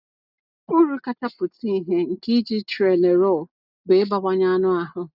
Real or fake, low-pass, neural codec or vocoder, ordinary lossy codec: real; 5.4 kHz; none; none